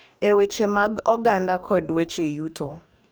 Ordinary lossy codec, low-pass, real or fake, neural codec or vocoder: none; none; fake; codec, 44.1 kHz, 2.6 kbps, DAC